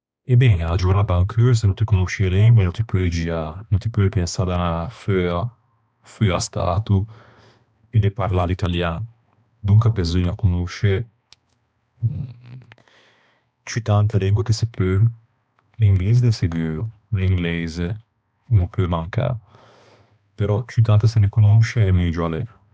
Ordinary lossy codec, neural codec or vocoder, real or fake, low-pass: none; codec, 16 kHz, 2 kbps, X-Codec, HuBERT features, trained on balanced general audio; fake; none